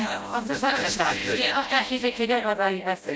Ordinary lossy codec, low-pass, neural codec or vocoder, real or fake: none; none; codec, 16 kHz, 0.5 kbps, FreqCodec, smaller model; fake